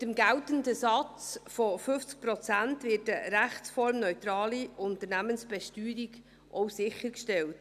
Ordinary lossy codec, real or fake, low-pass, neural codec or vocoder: none; real; 14.4 kHz; none